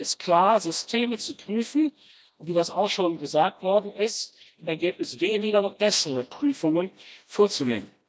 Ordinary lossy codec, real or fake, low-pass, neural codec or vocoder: none; fake; none; codec, 16 kHz, 1 kbps, FreqCodec, smaller model